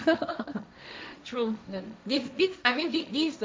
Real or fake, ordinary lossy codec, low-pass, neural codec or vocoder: fake; none; none; codec, 16 kHz, 1.1 kbps, Voila-Tokenizer